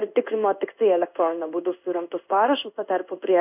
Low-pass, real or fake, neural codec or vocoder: 3.6 kHz; fake; codec, 16 kHz in and 24 kHz out, 1 kbps, XY-Tokenizer